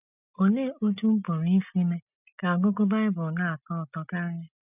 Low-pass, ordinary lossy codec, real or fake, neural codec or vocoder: 3.6 kHz; none; real; none